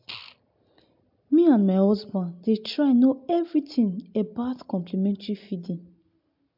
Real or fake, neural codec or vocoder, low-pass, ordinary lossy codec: real; none; 5.4 kHz; MP3, 48 kbps